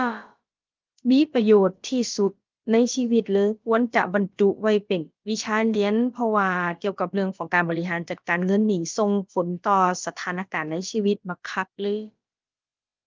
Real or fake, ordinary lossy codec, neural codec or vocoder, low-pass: fake; Opus, 24 kbps; codec, 16 kHz, about 1 kbps, DyCAST, with the encoder's durations; 7.2 kHz